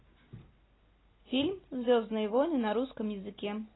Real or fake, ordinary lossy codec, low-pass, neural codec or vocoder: real; AAC, 16 kbps; 7.2 kHz; none